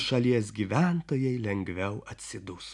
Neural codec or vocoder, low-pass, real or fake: none; 10.8 kHz; real